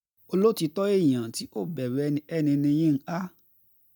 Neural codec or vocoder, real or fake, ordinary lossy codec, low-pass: none; real; none; 19.8 kHz